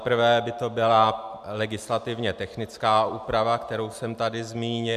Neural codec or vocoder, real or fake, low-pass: none; real; 14.4 kHz